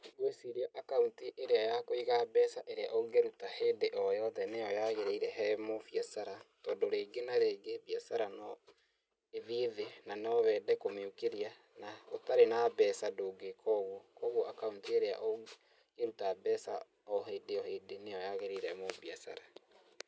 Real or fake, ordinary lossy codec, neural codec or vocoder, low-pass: real; none; none; none